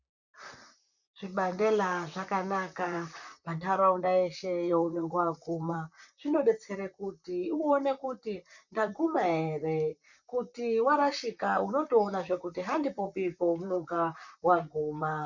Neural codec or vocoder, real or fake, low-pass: vocoder, 44.1 kHz, 128 mel bands, Pupu-Vocoder; fake; 7.2 kHz